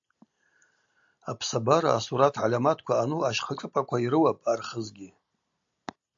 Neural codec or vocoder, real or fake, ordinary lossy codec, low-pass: none; real; AAC, 64 kbps; 7.2 kHz